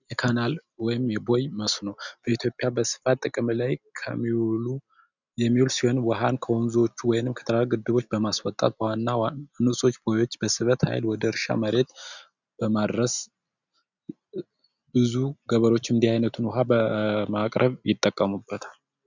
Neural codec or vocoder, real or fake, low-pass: none; real; 7.2 kHz